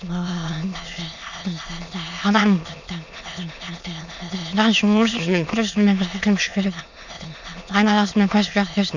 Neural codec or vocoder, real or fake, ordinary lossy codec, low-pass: autoencoder, 22.05 kHz, a latent of 192 numbers a frame, VITS, trained on many speakers; fake; none; 7.2 kHz